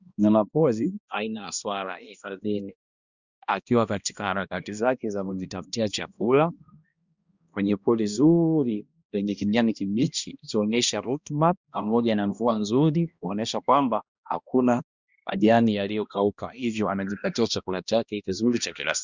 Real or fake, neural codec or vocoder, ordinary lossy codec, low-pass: fake; codec, 16 kHz, 1 kbps, X-Codec, HuBERT features, trained on balanced general audio; Opus, 64 kbps; 7.2 kHz